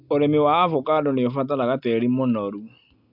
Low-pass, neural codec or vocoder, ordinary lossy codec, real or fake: 5.4 kHz; none; AAC, 48 kbps; real